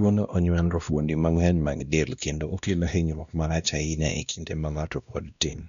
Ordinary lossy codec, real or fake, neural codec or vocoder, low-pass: none; fake; codec, 16 kHz, 1 kbps, X-Codec, WavLM features, trained on Multilingual LibriSpeech; 7.2 kHz